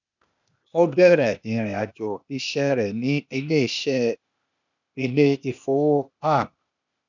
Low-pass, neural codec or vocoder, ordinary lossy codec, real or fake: 7.2 kHz; codec, 16 kHz, 0.8 kbps, ZipCodec; none; fake